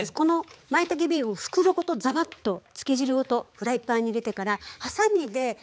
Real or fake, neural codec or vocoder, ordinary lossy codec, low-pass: fake; codec, 16 kHz, 4 kbps, X-Codec, HuBERT features, trained on balanced general audio; none; none